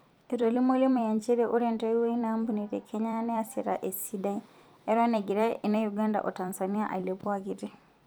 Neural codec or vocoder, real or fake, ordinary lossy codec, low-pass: vocoder, 44.1 kHz, 128 mel bands every 256 samples, BigVGAN v2; fake; none; none